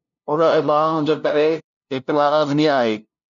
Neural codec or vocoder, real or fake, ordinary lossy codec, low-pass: codec, 16 kHz, 0.5 kbps, FunCodec, trained on LibriTTS, 25 frames a second; fake; MP3, 96 kbps; 7.2 kHz